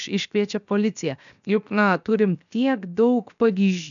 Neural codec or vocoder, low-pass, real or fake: codec, 16 kHz, 0.7 kbps, FocalCodec; 7.2 kHz; fake